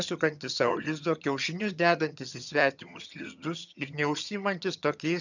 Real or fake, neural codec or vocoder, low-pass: fake; vocoder, 22.05 kHz, 80 mel bands, HiFi-GAN; 7.2 kHz